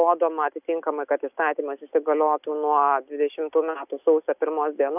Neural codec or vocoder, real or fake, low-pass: none; real; 3.6 kHz